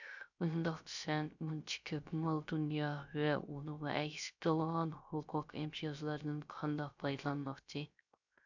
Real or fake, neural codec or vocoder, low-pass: fake; codec, 16 kHz, 0.3 kbps, FocalCodec; 7.2 kHz